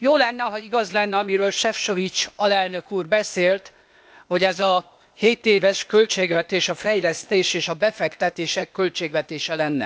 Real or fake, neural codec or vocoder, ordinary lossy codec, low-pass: fake; codec, 16 kHz, 0.8 kbps, ZipCodec; none; none